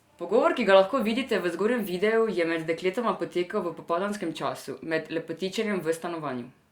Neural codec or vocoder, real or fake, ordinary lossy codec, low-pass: none; real; Opus, 64 kbps; 19.8 kHz